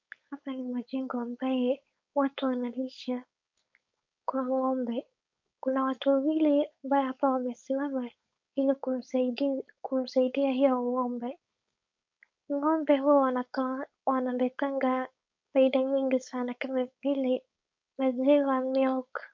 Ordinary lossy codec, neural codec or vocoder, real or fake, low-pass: MP3, 48 kbps; codec, 16 kHz, 4.8 kbps, FACodec; fake; 7.2 kHz